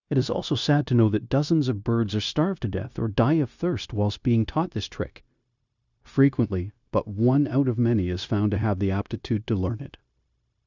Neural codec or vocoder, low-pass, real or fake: codec, 16 kHz, 0.9 kbps, LongCat-Audio-Codec; 7.2 kHz; fake